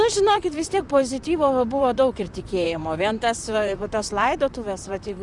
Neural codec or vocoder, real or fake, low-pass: vocoder, 44.1 kHz, 128 mel bands, Pupu-Vocoder; fake; 10.8 kHz